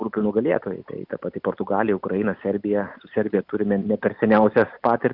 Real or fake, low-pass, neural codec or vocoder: real; 5.4 kHz; none